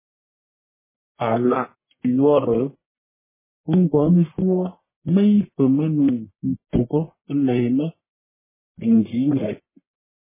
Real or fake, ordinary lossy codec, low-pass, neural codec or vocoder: fake; MP3, 16 kbps; 3.6 kHz; codec, 44.1 kHz, 1.7 kbps, Pupu-Codec